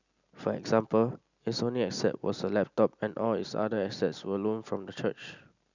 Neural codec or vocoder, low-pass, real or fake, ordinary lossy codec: none; 7.2 kHz; real; none